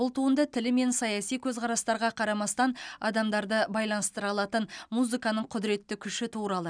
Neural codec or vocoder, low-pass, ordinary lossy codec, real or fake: none; 9.9 kHz; none; real